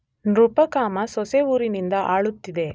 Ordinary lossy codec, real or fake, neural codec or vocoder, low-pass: none; real; none; none